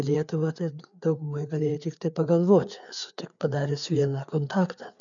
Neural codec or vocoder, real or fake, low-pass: codec, 16 kHz, 4 kbps, FreqCodec, larger model; fake; 7.2 kHz